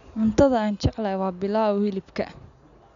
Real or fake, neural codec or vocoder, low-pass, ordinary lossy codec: real; none; 7.2 kHz; none